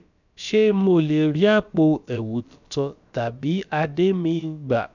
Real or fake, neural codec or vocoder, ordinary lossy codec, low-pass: fake; codec, 16 kHz, about 1 kbps, DyCAST, with the encoder's durations; none; 7.2 kHz